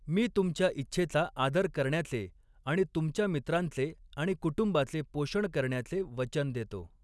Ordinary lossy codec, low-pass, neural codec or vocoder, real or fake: none; none; none; real